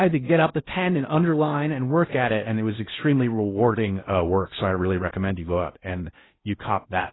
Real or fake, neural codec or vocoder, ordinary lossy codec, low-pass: fake; codec, 16 kHz in and 24 kHz out, 0.6 kbps, FocalCodec, streaming, 4096 codes; AAC, 16 kbps; 7.2 kHz